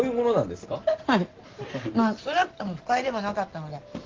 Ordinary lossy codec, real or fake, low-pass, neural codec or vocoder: Opus, 16 kbps; real; 7.2 kHz; none